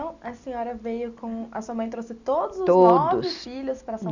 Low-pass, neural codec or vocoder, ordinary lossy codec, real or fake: 7.2 kHz; none; none; real